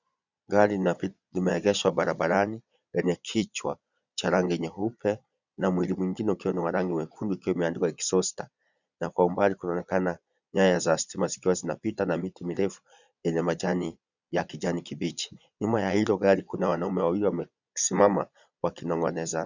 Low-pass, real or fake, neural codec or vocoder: 7.2 kHz; fake; vocoder, 22.05 kHz, 80 mel bands, Vocos